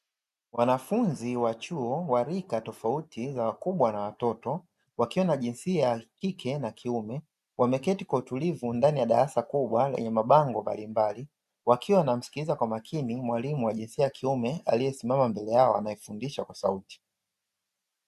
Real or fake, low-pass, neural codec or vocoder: real; 14.4 kHz; none